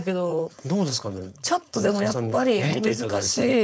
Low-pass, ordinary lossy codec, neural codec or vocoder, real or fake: none; none; codec, 16 kHz, 4.8 kbps, FACodec; fake